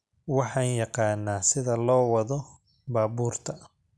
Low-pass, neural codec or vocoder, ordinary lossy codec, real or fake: none; none; none; real